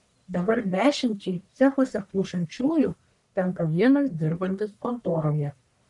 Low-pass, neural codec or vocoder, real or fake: 10.8 kHz; codec, 44.1 kHz, 1.7 kbps, Pupu-Codec; fake